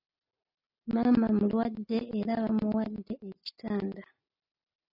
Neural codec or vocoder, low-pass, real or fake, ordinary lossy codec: none; 5.4 kHz; real; AAC, 24 kbps